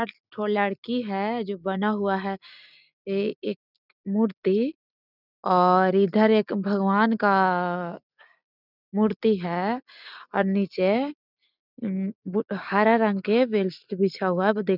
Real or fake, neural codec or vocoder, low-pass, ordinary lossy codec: real; none; 5.4 kHz; none